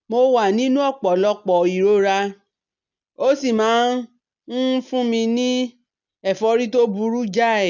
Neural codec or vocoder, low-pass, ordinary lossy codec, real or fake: none; 7.2 kHz; none; real